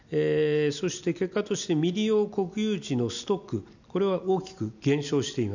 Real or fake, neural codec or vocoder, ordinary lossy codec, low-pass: real; none; none; 7.2 kHz